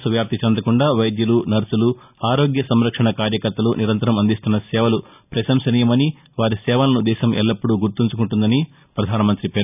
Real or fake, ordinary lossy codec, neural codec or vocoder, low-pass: real; none; none; 3.6 kHz